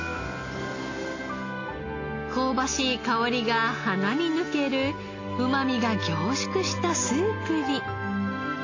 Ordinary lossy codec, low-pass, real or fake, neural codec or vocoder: AAC, 32 kbps; 7.2 kHz; real; none